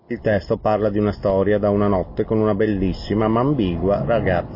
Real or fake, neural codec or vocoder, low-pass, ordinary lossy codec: real; none; 5.4 kHz; MP3, 24 kbps